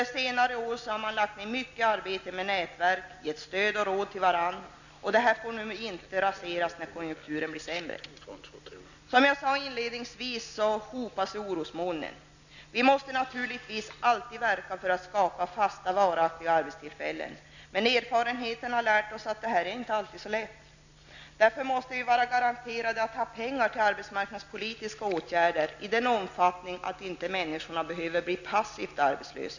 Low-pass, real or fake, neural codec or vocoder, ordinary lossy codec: 7.2 kHz; real; none; none